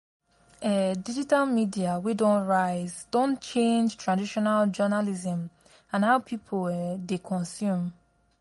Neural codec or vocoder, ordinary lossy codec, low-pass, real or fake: none; MP3, 48 kbps; 19.8 kHz; real